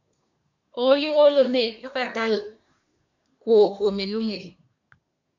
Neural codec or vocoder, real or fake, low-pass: codec, 24 kHz, 1 kbps, SNAC; fake; 7.2 kHz